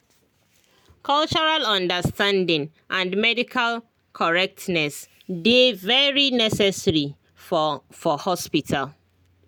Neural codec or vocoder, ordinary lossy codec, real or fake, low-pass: none; none; real; none